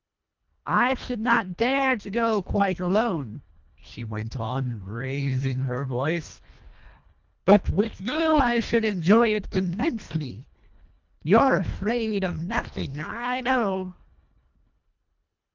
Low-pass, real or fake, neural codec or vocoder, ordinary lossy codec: 7.2 kHz; fake; codec, 24 kHz, 1.5 kbps, HILCodec; Opus, 24 kbps